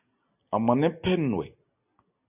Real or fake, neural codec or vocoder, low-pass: real; none; 3.6 kHz